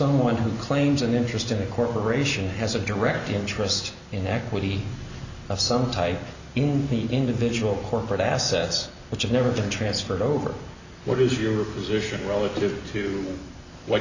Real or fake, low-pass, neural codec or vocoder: real; 7.2 kHz; none